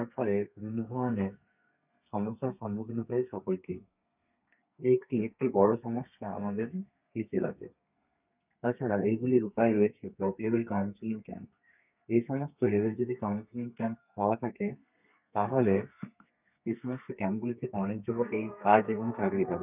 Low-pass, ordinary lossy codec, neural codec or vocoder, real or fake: 3.6 kHz; none; codec, 32 kHz, 1.9 kbps, SNAC; fake